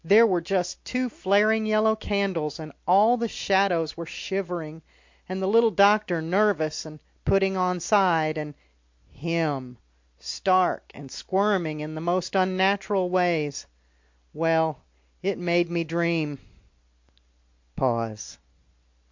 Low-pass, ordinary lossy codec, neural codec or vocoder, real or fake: 7.2 kHz; MP3, 48 kbps; none; real